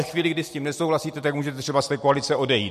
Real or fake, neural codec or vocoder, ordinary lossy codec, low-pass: real; none; MP3, 64 kbps; 14.4 kHz